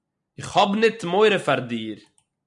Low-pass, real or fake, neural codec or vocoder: 10.8 kHz; real; none